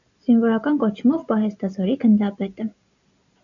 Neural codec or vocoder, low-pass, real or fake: none; 7.2 kHz; real